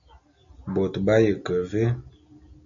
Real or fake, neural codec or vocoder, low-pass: real; none; 7.2 kHz